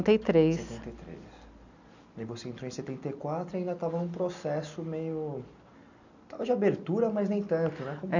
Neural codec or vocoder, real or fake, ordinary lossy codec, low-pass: none; real; none; 7.2 kHz